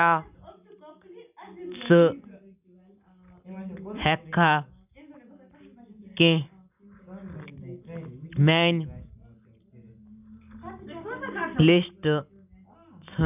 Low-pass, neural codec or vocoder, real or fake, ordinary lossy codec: 3.6 kHz; none; real; none